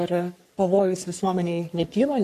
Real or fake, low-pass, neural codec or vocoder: fake; 14.4 kHz; codec, 44.1 kHz, 3.4 kbps, Pupu-Codec